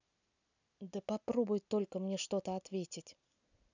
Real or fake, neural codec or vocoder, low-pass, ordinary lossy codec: real; none; 7.2 kHz; none